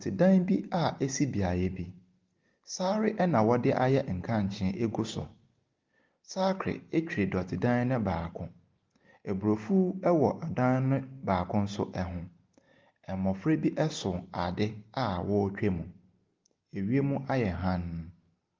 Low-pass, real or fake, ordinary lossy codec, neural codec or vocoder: 7.2 kHz; real; Opus, 32 kbps; none